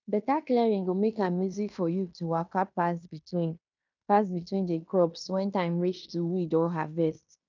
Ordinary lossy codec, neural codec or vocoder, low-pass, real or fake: none; codec, 16 kHz in and 24 kHz out, 0.9 kbps, LongCat-Audio-Codec, fine tuned four codebook decoder; 7.2 kHz; fake